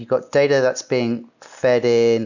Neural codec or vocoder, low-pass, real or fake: none; 7.2 kHz; real